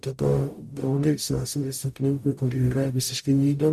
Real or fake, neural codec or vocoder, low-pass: fake; codec, 44.1 kHz, 0.9 kbps, DAC; 14.4 kHz